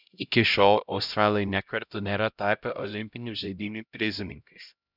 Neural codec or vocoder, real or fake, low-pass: codec, 16 kHz, 0.5 kbps, X-Codec, HuBERT features, trained on LibriSpeech; fake; 5.4 kHz